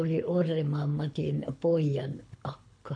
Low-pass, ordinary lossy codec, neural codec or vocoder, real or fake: 9.9 kHz; none; codec, 24 kHz, 6 kbps, HILCodec; fake